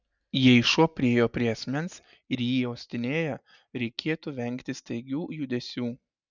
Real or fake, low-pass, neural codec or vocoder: real; 7.2 kHz; none